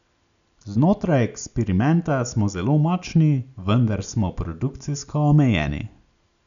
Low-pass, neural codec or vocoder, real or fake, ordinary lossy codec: 7.2 kHz; none; real; none